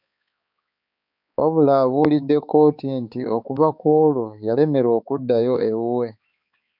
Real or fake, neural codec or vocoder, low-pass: fake; codec, 16 kHz, 4 kbps, X-Codec, HuBERT features, trained on balanced general audio; 5.4 kHz